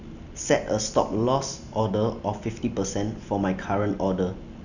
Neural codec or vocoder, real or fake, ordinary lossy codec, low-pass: none; real; none; 7.2 kHz